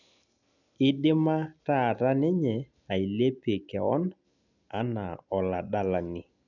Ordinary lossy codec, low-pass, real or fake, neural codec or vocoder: none; 7.2 kHz; real; none